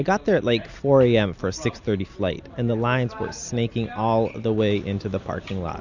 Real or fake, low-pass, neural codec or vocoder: real; 7.2 kHz; none